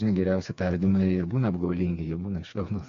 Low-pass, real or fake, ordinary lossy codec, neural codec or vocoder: 7.2 kHz; fake; AAC, 48 kbps; codec, 16 kHz, 4 kbps, FreqCodec, smaller model